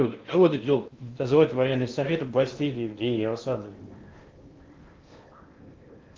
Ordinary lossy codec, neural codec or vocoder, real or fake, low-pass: Opus, 32 kbps; codec, 16 kHz in and 24 kHz out, 0.8 kbps, FocalCodec, streaming, 65536 codes; fake; 7.2 kHz